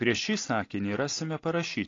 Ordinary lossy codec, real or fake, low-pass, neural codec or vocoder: AAC, 32 kbps; real; 7.2 kHz; none